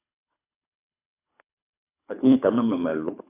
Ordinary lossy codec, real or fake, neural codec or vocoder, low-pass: AAC, 24 kbps; fake; codec, 24 kHz, 3 kbps, HILCodec; 3.6 kHz